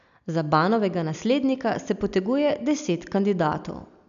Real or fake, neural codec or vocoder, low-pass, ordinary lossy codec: real; none; 7.2 kHz; none